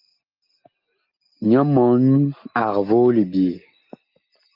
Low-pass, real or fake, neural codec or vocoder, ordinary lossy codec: 5.4 kHz; real; none; Opus, 32 kbps